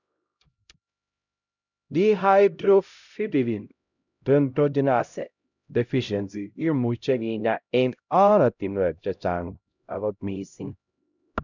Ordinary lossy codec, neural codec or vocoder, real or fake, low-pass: none; codec, 16 kHz, 0.5 kbps, X-Codec, HuBERT features, trained on LibriSpeech; fake; 7.2 kHz